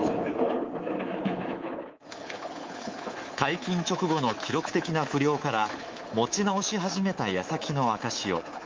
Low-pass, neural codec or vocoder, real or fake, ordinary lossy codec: 7.2 kHz; codec, 24 kHz, 3.1 kbps, DualCodec; fake; Opus, 32 kbps